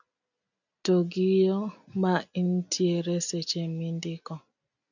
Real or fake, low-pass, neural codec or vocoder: real; 7.2 kHz; none